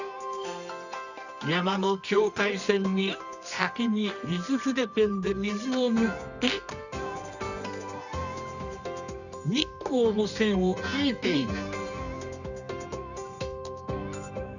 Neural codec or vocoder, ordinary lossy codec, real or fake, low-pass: codec, 24 kHz, 0.9 kbps, WavTokenizer, medium music audio release; none; fake; 7.2 kHz